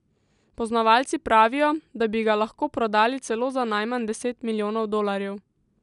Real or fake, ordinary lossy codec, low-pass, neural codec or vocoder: real; none; 10.8 kHz; none